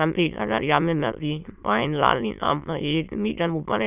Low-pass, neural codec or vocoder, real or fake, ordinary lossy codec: 3.6 kHz; autoencoder, 22.05 kHz, a latent of 192 numbers a frame, VITS, trained on many speakers; fake; none